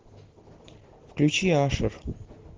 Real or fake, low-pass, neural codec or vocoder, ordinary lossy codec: real; 7.2 kHz; none; Opus, 16 kbps